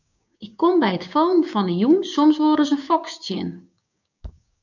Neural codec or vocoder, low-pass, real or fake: codec, 16 kHz, 6 kbps, DAC; 7.2 kHz; fake